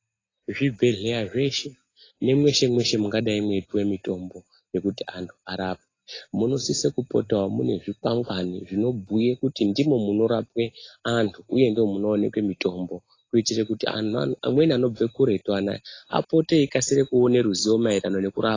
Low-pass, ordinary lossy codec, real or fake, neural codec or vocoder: 7.2 kHz; AAC, 32 kbps; real; none